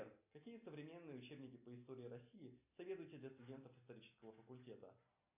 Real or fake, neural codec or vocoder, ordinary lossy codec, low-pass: real; none; AAC, 32 kbps; 3.6 kHz